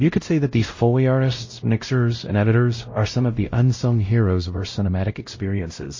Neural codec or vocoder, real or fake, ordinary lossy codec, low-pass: codec, 16 kHz, 0.5 kbps, X-Codec, WavLM features, trained on Multilingual LibriSpeech; fake; MP3, 32 kbps; 7.2 kHz